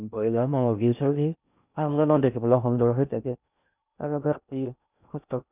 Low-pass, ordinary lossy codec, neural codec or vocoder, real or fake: 3.6 kHz; none; codec, 16 kHz in and 24 kHz out, 0.6 kbps, FocalCodec, streaming, 4096 codes; fake